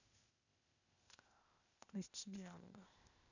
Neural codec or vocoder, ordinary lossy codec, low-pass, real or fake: codec, 16 kHz, 0.8 kbps, ZipCodec; none; 7.2 kHz; fake